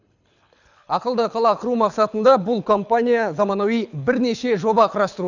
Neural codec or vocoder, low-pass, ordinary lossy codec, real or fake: codec, 24 kHz, 6 kbps, HILCodec; 7.2 kHz; none; fake